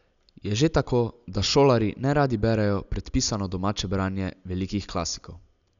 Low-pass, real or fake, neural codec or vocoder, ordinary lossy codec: 7.2 kHz; real; none; none